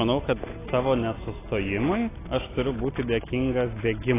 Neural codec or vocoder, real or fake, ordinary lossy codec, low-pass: none; real; AAC, 16 kbps; 3.6 kHz